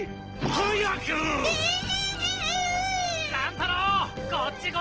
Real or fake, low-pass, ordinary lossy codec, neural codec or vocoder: real; 7.2 kHz; Opus, 16 kbps; none